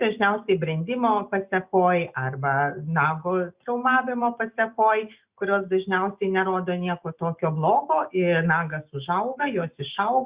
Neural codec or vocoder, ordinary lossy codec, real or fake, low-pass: none; Opus, 64 kbps; real; 3.6 kHz